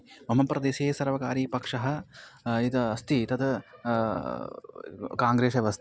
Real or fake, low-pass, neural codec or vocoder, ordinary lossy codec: real; none; none; none